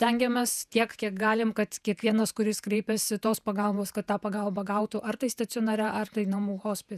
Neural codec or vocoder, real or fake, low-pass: vocoder, 48 kHz, 128 mel bands, Vocos; fake; 14.4 kHz